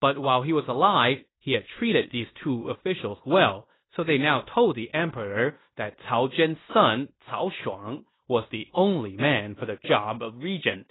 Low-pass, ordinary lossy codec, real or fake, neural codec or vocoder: 7.2 kHz; AAC, 16 kbps; fake; codec, 16 kHz in and 24 kHz out, 0.9 kbps, LongCat-Audio-Codec, fine tuned four codebook decoder